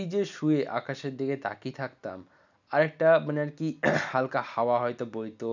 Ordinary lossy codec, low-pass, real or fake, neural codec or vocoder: none; 7.2 kHz; real; none